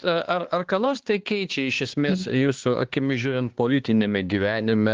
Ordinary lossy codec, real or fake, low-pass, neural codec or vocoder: Opus, 16 kbps; fake; 7.2 kHz; codec, 16 kHz, 2 kbps, X-Codec, HuBERT features, trained on balanced general audio